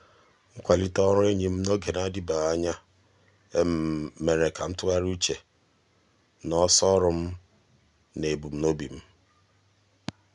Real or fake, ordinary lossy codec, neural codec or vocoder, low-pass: real; none; none; 10.8 kHz